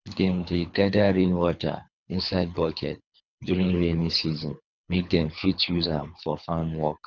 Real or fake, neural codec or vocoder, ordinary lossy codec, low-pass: fake; codec, 24 kHz, 3 kbps, HILCodec; none; 7.2 kHz